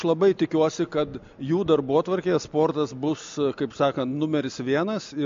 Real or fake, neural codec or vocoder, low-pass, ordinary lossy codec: real; none; 7.2 kHz; MP3, 48 kbps